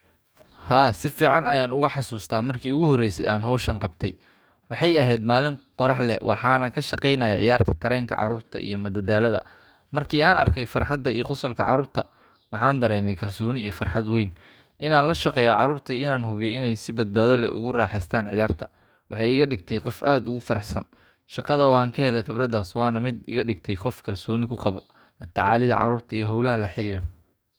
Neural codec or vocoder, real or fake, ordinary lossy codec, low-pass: codec, 44.1 kHz, 2.6 kbps, DAC; fake; none; none